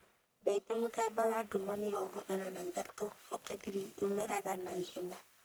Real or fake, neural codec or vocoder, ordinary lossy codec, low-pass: fake; codec, 44.1 kHz, 1.7 kbps, Pupu-Codec; none; none